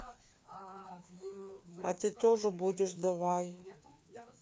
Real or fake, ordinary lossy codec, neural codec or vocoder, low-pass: fake; none; codec, 16 kHz, 2 kbps, FreqCodec, larger model; none